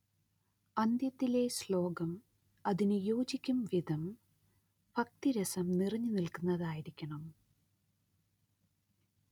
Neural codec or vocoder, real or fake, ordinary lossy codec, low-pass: none; real; none; 19.8 kHz